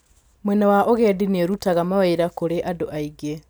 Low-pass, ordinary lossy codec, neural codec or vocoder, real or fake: none; none; none; real